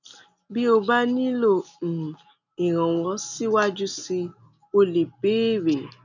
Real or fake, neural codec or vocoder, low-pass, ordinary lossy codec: real; none; 7.2 kHz; none